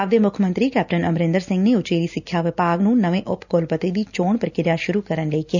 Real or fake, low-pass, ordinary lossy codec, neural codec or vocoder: real; 7.2 kHz; none; none